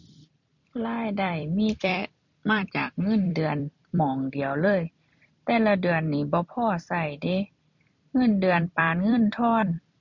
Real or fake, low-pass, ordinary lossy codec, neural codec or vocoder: fake; 7.2 kHz; none; vocoder, 44.1 kHz, 128 mel bands every 256 samples, BigVGAN v2